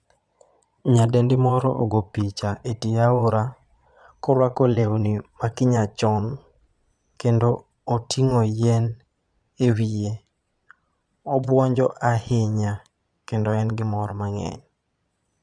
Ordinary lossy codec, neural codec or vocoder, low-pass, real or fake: none; vocoder, 22.05 kHz, 80 mel bands, Vocos; 9.9 kHz; fake